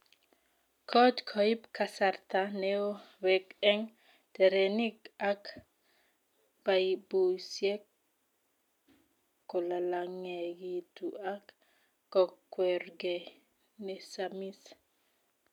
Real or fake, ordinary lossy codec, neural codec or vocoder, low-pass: real; none; none; 19.8 kHz